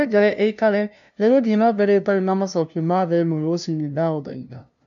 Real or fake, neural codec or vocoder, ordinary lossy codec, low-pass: fake; codec, 16 kHz, 0.5 kbps, FunCodec, trained on LibriTTS, 25 frames a second; none; 7.2 kHz